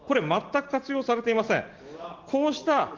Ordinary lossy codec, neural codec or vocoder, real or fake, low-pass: Opus, 16 kbps; none; real; 7.2 kHz